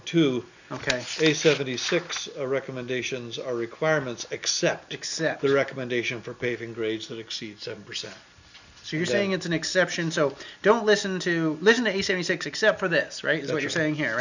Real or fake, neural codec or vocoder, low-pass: real; none; 7.2 kHz